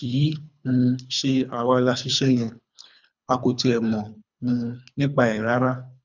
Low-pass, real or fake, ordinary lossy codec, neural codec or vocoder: 7.2 kHz; fake; none; codec, 24 kHz, 3 kbps, HILCodec